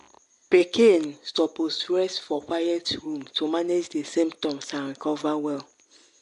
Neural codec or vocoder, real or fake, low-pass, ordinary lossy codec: none; real; 10.8 kHz; AAC, 64 kbps